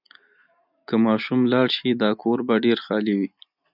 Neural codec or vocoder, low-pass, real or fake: none; 5.4 kHz; real